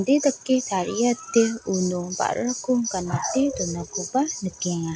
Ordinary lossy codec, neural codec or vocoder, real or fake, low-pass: none; none; real; none